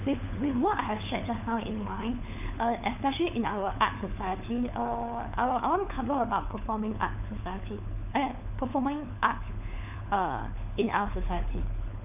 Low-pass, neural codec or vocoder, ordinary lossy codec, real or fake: 3.6 kHz; codec, 16 kHz, 4 kbps, FunCodec, trained on LibriTTS, 50 frames a second; none; fake